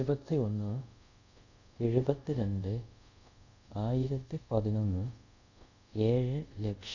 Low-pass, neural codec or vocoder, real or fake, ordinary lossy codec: 7.2 kHz; codec, 24 kHz, 0.5 kbps, DualCodec; fake; none